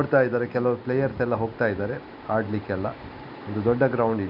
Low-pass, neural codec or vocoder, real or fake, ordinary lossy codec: 5.4 kHz; none; real; none